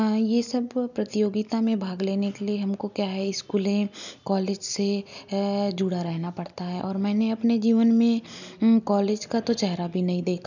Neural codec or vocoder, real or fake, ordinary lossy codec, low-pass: none; real; none; 7.2 kHz